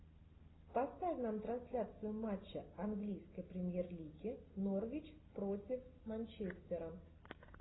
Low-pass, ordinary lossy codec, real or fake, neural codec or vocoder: 7.2 kHz; AAC, 16 kbps; real; none